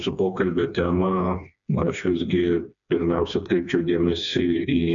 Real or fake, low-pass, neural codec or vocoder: fake; 7.2 kHz; codec, 16 kHz, 2 kbps, FreqCodec, smaller model